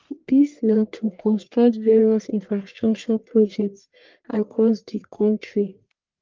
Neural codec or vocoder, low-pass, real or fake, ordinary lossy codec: codec, 44.1 kHz, 1.7 kbps, Pupu-Codec; 7.2 kHz; fake; Opus, 24 kbps